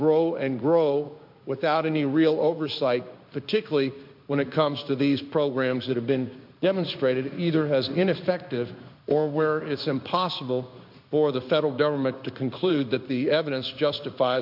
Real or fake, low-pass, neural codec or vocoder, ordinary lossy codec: fake; 5.4 kHz; codec, 16 kHz in and 24 kHz out, 1 kbps, XY-Tokenizer; MP3, 48 kbps